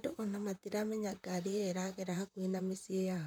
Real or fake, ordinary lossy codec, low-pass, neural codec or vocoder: fake; none; none; vocoder, 44.1 kHz, 128 mel bands, Pupu-Vocoder